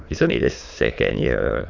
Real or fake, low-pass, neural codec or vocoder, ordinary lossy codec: fake; 7.2 kHz; autoencoder, 22.05 kHz, a latent of 192 numbers a frame, VITS, trained on many speakers; none